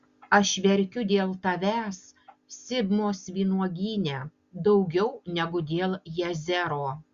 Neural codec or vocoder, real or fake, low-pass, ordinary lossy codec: none; real; 7.2 kHz; Opus, 64 kbps